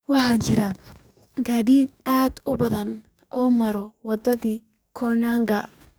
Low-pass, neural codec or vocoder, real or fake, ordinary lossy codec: none; codec, 44.1 kHz, 2.6 kbps, DAC; fake; none